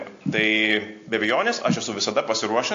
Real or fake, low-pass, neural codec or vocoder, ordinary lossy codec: real; 7.2 kHz; none; AAC, 48 kbps